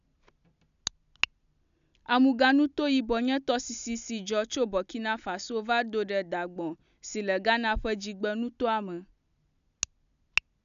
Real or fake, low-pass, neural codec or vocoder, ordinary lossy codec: real; 7.2 kHz; none; none